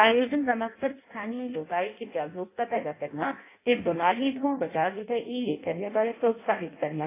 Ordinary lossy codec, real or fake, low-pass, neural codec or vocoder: AAC, 24 kbps; fake; 3.6 kHz; codec, 16 kHz in and 24 kHz out, 0.6 kbps, FireRedTTS-2 codec